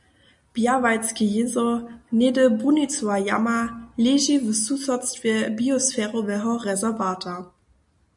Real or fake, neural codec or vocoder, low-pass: real; none; 10.8 kHz